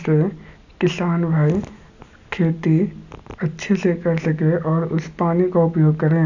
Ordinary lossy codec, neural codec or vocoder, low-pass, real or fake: none; none; 7.2 kHz; real